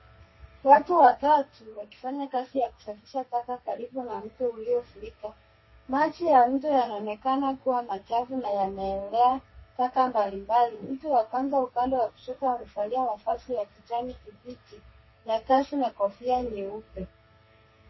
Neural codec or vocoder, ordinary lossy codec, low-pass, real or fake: codec, 32 kHz, 1.9 kbps, SNAC; MP3, 24 kbps; 7.2 kHz; fake